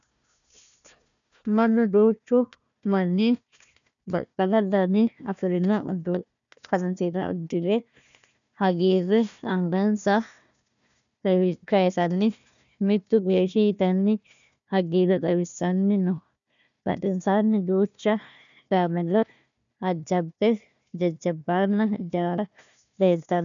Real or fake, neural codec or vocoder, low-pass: fake; codec, 16 kHz, 1 kbps, FunCodec, trained on Chinese and English, 50 frames a second; 7.2 kHz